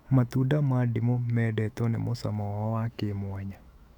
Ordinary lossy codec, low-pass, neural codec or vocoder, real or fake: none; 19.8 kHz; autoencoder, 48 kHz, 128 numbers a frame, DAC-VAE, trained on Japanese speech; fake